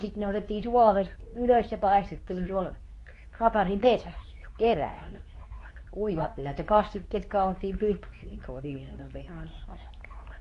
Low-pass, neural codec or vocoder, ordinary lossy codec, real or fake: 10.8 kHz; codec, 24 kHz, 0.9 kbps, WavTokenizer, medium speech release version 2; AAC, 48 kbps; fake